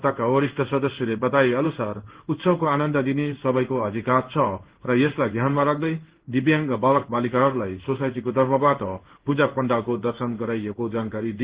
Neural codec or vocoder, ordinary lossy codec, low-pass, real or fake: codec, 16 kHz in and 24 kHz out, 1 kbps, XY-Tokenizer; Opus, 16 kbps; 3.6 kHz; fake